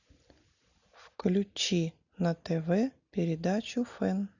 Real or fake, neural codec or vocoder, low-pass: real; none; 7.2 kHz